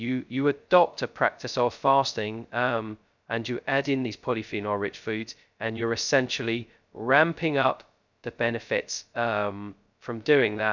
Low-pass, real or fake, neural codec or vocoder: 7.2 kHz; fake; codec, 16 kHz, 0.2 kbps, FocalCodec